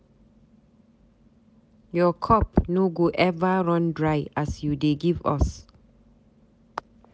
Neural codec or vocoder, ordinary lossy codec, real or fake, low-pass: none; none; real; none